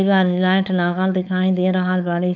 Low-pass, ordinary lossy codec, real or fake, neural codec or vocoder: 7.2 kHz; none; fake; codec, 16 kHz, 4.8 kbps, FACodec